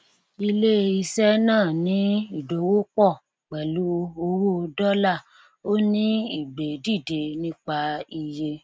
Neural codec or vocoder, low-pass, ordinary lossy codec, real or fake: none; none; none; real